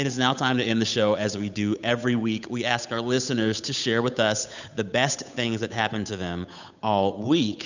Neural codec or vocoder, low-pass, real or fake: codec, 16 kHz, 8 kbps, FunCodec, trained on Chinese and English, 25 frames a second; 7.2 kHz; fake